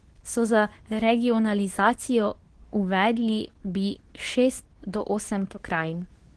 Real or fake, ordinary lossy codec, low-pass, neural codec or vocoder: fake; Opus, 16 kbps; 10.8 kHz; codec, 24 kHz, 0.9 kbps, WavTokenizer, medium speech release version 2